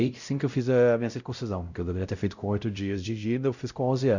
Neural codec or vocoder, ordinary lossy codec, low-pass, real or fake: codec, 16 kHz, 0.5 kbps, X-Codec, WavLM features, trained on Multilingual LibriSpeech; none; 7.2 kHz; fake